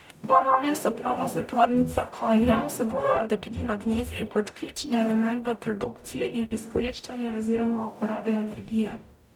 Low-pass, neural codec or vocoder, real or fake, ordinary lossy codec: 19.8 kHz; codec, 44.1 kHz, 0.9 kbps, DAC; fake; none